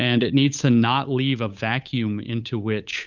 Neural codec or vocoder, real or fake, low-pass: codec, 16 kHz, 8 kbps, FunCodec, trained on Chinese and English, 25 frames a second; fake; 7.2 kHz